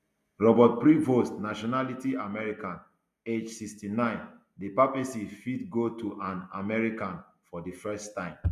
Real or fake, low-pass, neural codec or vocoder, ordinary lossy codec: real; 14.4 kHz; none; MP3, 96 kbps